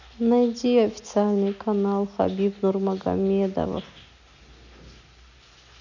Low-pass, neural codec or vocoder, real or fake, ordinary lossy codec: 7.2 kHz; none; real; none